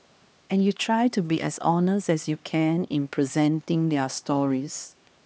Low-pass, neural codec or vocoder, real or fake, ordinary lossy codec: none; codec, 16 kHz, 2 kbps, X-Codec, HuBERT features, trained on LibriSpeech; fake; none